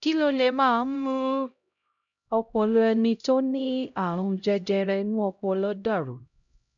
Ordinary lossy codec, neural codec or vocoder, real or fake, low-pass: none; codec, 16 kHz, 0.5 kbps, X-Codec, HuBERT features, trained on LibriSpeech; fake; 7.2 kHz